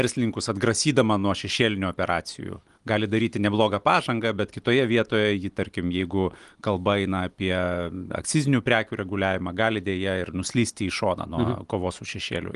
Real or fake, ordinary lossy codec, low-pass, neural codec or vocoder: real; Opus, 24 kbps; 10.8 kHz; none